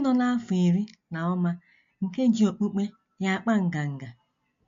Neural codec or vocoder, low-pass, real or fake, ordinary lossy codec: none; 7.2 kHz; real; MP3, 48 kbps